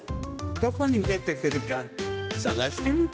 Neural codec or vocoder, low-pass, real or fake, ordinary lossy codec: codec, 16 kHz, 1 kbps, X-Codec, HuBERT features, trained on general audio; none; fake; none